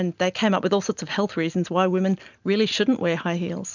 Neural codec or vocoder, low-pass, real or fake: none; 7.2 kHz; real